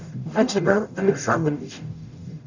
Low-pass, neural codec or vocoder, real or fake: 7.2 kHz; codec, 44.1 kHz, 0.9 kbps, DAC; fake